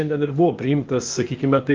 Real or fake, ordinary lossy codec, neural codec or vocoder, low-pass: fake; Opus, 32 kbps; codec, 16 kHz, 0.8 kbps, ZipCodec; 7.2 kHz